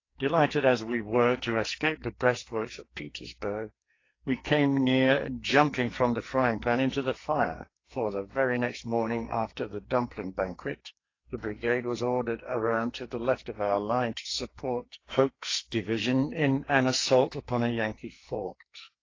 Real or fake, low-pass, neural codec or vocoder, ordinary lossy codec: fake; 7.2 kHz; codec, 44.1 kHz, 2.6 kbps, SNAC; AAC, 32 kbps